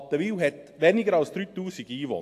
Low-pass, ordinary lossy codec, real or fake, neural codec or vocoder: 14.4 kHz; AAC, 64 kbps; real; none